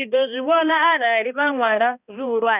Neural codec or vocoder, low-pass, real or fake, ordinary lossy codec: codec, 16 kHz, 1 kbps, X-Codec, HuBERT features, trained on balanced general audio; 3.6 kHz; fake; none